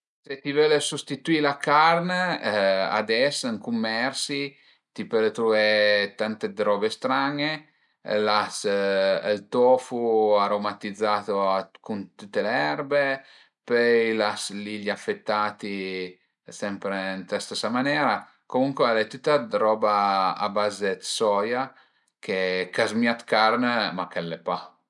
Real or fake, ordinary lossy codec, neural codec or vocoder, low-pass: real; none; none; 10.8 kHz